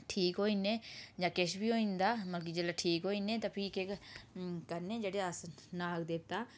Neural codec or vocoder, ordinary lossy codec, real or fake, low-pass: none; none; real; none